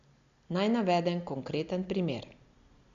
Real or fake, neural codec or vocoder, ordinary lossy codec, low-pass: real; none; Opus, 64 kbps; 7.2 kHz